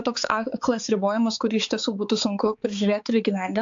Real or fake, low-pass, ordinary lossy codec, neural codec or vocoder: fake; 7.2 kHz; AAC, 64 kbps; codec, 16 kHz, 4 kbps, X-Codec, HuBERT features, trained on balanced general audio